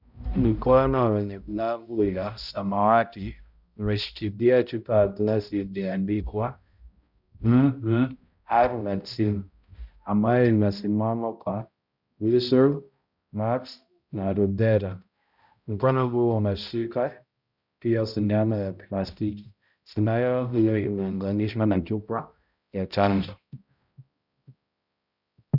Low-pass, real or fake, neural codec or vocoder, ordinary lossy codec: 5.4 kHz; fake; codec, 16 kHz, 0.5 kbps, X-Codec, HuBERT features, trained on balanced general audio; Opus, 64 kbps